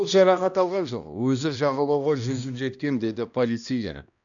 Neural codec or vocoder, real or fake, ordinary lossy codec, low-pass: codec, 16 kHz, 1 kbps, X-Codec, HuBERT features, trained on balanced general audio; fake; none; 7.2 kHz